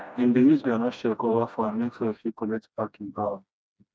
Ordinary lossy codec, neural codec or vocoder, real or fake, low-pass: none; codec, 16 kHz, 1 kbps, FreqCodec, smaller model; fake; none